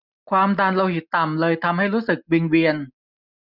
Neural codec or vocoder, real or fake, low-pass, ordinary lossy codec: none; real; 5.4 kHz; none